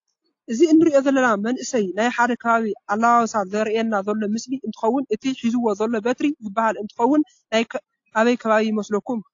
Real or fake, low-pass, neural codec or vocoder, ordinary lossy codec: real; 7.2 kHz; none; AAC, 48 kbps